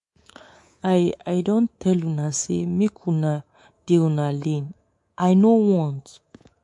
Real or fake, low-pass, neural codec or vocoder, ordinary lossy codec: fake; 10.8 kHz; codec, 24 kHz, 3.1 kbps, DualCodec; MP3, 48 kbps